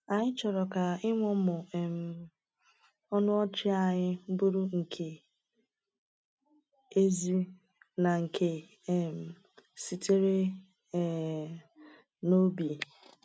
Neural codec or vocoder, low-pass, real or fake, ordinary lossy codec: none; none; real; none